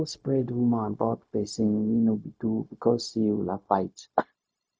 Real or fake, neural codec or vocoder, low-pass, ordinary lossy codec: fake; codec, 16 kHz, 0.4 kbps, LongCat-Audio-Codec; none; none